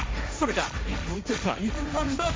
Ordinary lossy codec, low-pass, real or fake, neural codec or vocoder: none; none; fake; codec, 16 kHz, 1.1 kbps, Voila-Tokenizer